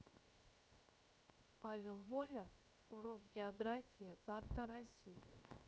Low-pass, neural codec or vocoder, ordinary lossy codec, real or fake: none; codec, 16 kHz, 0.7 kbps, FocalCodec; none; fake